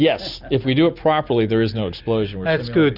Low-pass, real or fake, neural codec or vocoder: 5.4 kHz; real; none